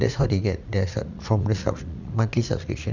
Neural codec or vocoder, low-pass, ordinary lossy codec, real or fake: vocoder, 44.1 kHz, 80 mel bands, Vocos; 7.2 kHz; none; fake